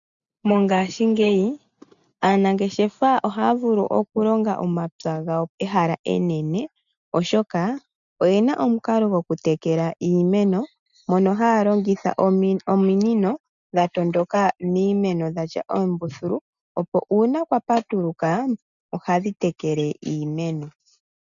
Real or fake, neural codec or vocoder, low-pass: real; none; 7.2 kHz